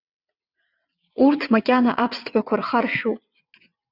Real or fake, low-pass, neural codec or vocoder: fake; 5.4 kHz; vocoder, 22.05 kHz, 80 mel bands, WaveNeXt